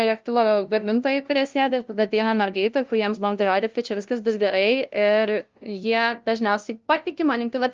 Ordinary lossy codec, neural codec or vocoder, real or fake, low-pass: Opus, 24 kbps; codec, 16 kHz, 0.5 kbps, FunCodec, trained on LibriTTS, 25 frames a second; fake; 7.2 kHz